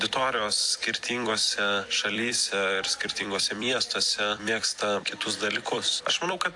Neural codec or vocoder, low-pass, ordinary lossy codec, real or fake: vocoder, 44.1 kHz, 128 mel bands every 256 samples, BigVGAN v2; 10.8 kHz; AAC, 64 kbps; fake